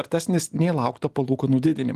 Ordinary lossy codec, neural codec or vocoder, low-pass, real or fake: Opus, 24 kbps; none; 14.4 kHz; real